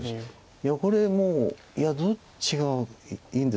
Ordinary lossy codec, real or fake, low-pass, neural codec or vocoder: none; real; none; none